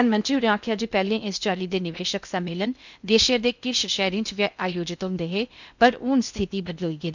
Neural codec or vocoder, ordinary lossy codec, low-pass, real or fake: codec, 16 kHz in and 24 kHz out, 0.6 kbps, FocalCodec, streaming, 2048 codes; none; 7.2 kHz; fake